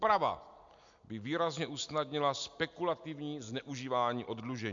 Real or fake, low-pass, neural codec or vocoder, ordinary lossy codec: real; 7.2 kHz; none; MP3, 64 kbps